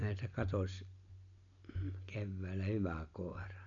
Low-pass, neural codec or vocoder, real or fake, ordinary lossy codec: 7.2 kHz; none; real; none